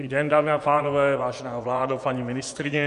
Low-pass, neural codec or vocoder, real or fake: 10.8 kHz; vocoder, 24 kHz, 100 mel bands, Vocos; fake